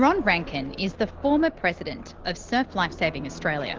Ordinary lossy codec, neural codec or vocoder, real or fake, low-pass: Opus, 16 kbps; none; real; 7.2 kHz